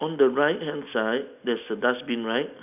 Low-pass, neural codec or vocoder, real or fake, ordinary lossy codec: 3.6 kHz; none; real; none